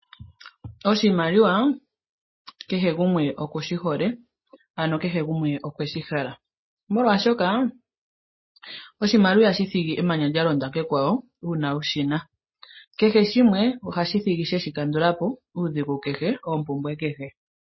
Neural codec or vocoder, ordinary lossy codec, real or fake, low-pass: none; MP3, 24 kbps; real; 7.2 kHz